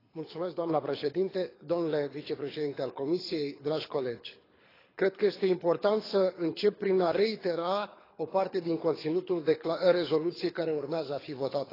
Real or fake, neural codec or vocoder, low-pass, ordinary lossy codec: fake; codec, 24 kHz, 6 kbps, HILCodec; 5.4 kHz; AAC, 24 kbps